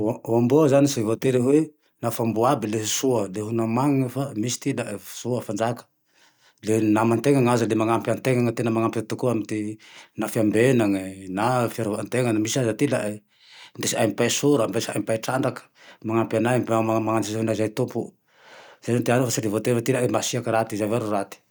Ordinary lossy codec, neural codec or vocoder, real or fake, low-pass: none; none; real; none